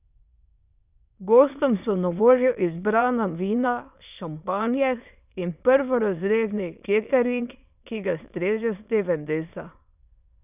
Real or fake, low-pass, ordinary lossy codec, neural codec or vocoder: fake; 3.6 kHz; none; autoencoder, 22.05 kHz, a latent of 192 numbers a frame, VITS, trained on many speakers